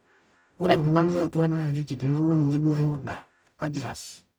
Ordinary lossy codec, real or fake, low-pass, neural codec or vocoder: none; fake; none; codec, 44.1 kHz, 0.9 kbps, DAC